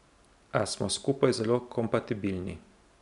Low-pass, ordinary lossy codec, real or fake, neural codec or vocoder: 10.8 kHz; none; real; none